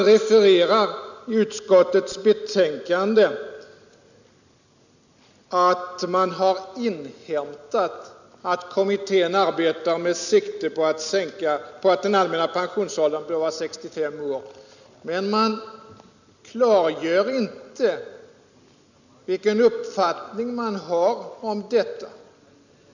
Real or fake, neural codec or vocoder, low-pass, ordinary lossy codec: real; none; 7.2 kHz; none